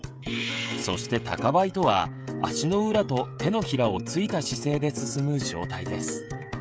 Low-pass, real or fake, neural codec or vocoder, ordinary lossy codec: none; fake; codec, 16 kHz, 16 kbps, FreqCodec, smaller model; none